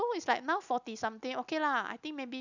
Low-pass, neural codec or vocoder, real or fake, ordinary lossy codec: 7.2 kHz; none; real; none